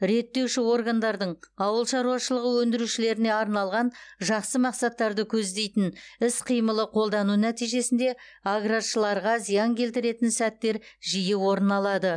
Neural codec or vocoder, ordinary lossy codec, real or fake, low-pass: none; none; real; 9.9 kHz